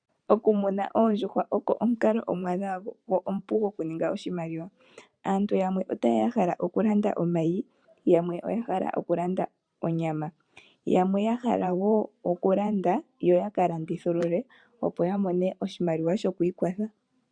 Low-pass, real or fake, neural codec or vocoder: 9.9 kHz; fake; vocoder, 44.1 kHz, 128 mel bands every 512 samples, BigVGAN v2